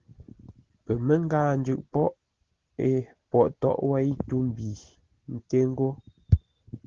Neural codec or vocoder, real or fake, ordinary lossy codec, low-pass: none; real; Opus, 16 kbps; 7.2 kHz